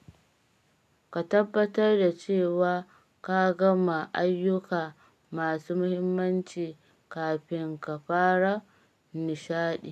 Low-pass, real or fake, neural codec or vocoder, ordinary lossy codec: 14.4 kHz; real; none; none